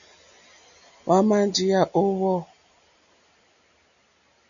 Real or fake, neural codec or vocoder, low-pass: real; none; 7.2 kHz